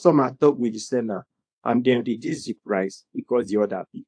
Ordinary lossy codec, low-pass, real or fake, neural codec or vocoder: AAC, 64 kbps; 9.9 kHz; fake; codec, 24 kHz, 0.9 kbps, WavTokenizer, small release